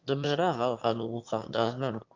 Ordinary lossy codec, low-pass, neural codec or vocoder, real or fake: Opus, 24 kbps; 7.2 kHz; autoencoder, 22.05 kHz, a latent of 192 numbers a frame, VITS, trained on one speaker; fake